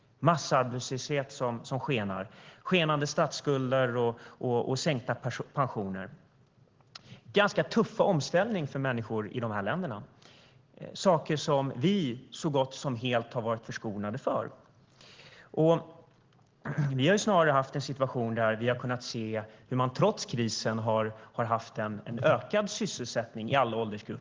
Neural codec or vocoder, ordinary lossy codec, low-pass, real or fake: none; Opus, 16 kbps; 7.2 kHz; real